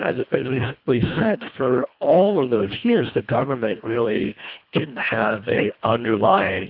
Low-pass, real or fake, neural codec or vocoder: 5.4 kHz; fake; codec, 24 kHz, 1.5 kbps, HILCodec